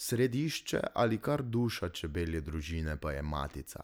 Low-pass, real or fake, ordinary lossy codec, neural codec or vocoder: none; real; none; none